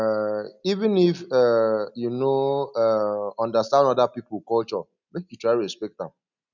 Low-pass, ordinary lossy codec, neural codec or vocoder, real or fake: 7.2 kHz; none; none; real